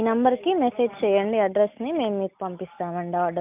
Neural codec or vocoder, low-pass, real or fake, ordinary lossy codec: none; 3.6 kHz; real; none